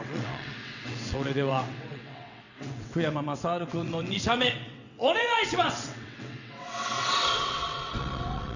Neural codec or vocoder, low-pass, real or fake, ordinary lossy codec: vocoder, 22.05 kHz, 80 mel bands, WaveNeXt; 7.2 kHz; fake; none